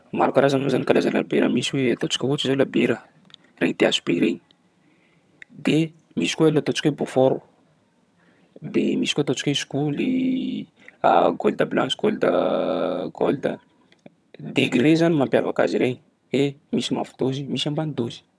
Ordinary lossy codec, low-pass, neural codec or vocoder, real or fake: none; none; vocoder, 22.05 kHz, 80 mel bands, HiFi-GAN; fake